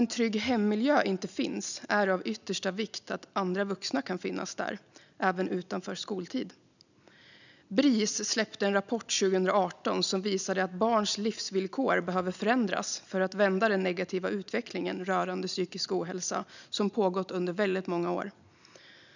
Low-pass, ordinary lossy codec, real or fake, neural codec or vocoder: 7.2 kHz; none; real; none